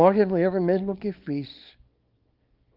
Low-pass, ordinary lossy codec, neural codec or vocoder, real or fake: 5.4 kHz; Opus, 24 kbps; codec, 24 kHz, 0.9 kbps, WavTokenizer, small release; fake